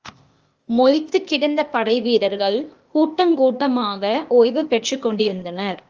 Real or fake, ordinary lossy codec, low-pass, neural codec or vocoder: fake; Opus, 24 kbps; 7.2 kHz; codec, 16 kHz, 0.8 kbps, ZipCodec